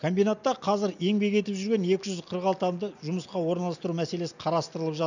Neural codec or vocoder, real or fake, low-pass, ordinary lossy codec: none; real; 7.2 kHz; none